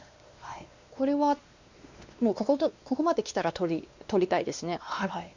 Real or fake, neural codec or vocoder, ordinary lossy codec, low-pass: fake; codec, 16 kHz, 2 kbps, X-Codec, WavLM features, trained on Multilingual LibriSpeech; Opus, 64 kbps; 7.2 kHz